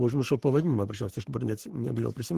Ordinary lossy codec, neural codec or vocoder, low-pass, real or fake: Opus, 16 kbps; codec, 44.1 kHz, 3.4 kbps, Pupu-Codec; 14.4 kHz; fake